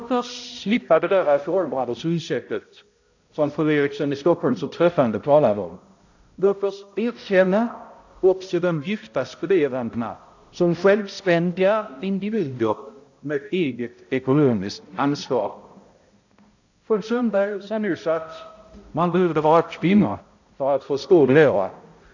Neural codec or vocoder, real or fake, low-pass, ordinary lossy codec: codec, 16 kHz, 0.5 kbps, X-Codec, HuBERT features, trained on balanced general audio; fake; 7.2 kHz; AAC, 48 kbps